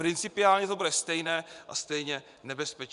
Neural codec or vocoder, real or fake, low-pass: vocoder, 24 kHz, 100 mel bands, Vocos; fake; 10.8 kHz